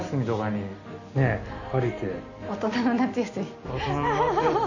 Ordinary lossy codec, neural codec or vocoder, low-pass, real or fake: none; none; 7.2 kHz; real